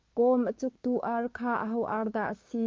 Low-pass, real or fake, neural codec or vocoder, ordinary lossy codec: 7.2 kHz; fake; codec, 16 kHz, 6 kbps, DAC; Opus, 24 kbps